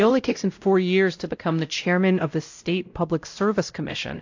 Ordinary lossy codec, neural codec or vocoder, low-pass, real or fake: AAC, 48 kbps; codec, 16 kHz, 0.5 kbps, X-Codec, WavLM features, trained on Multilingual LibriSpeech; 7.2 kHz; fake